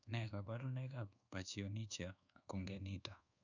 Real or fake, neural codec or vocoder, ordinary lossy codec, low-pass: fake; codec, 24 kHz, 1.2 kbps, DualCodec; none; 7.2 kHz